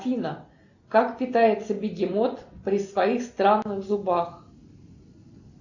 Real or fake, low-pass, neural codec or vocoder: real; 7.2 kHz; none